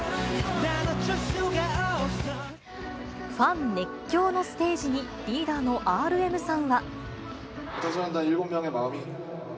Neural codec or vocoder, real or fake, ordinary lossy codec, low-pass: none; real; none; none